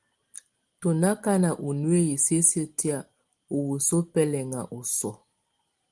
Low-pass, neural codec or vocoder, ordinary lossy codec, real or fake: 10.8 kHz; none; Opus, 24 kbps; real